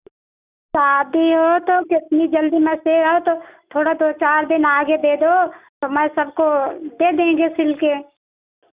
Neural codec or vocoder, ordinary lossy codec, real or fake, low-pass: none; Opus, 64 kbps; real; 3.6 kHz